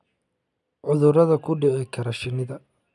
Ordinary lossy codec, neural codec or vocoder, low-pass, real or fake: none; none; none; real